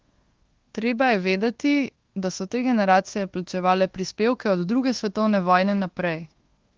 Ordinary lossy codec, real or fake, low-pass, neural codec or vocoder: Opus, 16 kbps; fake; 7.2 kHz; codec, 24 kHz, 1.2 kbps, DualCodec